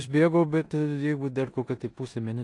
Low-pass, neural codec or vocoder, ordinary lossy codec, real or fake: 10.8 kHz; codec, 16 kHz in and 24 kHz out, 0.9 kbps, LongCat-Audio-Codec, four codebook decoder; AAC, 48 kbps; fake